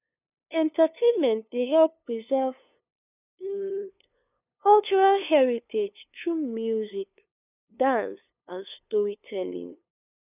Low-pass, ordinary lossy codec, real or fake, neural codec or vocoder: 3.6 kHz; none; fake; codec, 16 kHz, 2 kbps, FunCodec, trained on LibriTTS, 25 frames a second